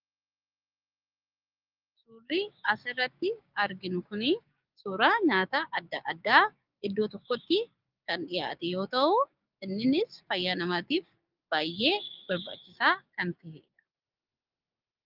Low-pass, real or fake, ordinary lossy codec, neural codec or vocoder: 5.4 kHz; fake; Opus, 24 kbps; codec, 16 kHz, 6 kbps, DAC